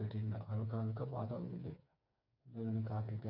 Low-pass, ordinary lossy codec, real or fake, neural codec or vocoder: 5.4 kHz; none; fake; codec, 16 kHz, 4 kbps, FreqCodec, smaller model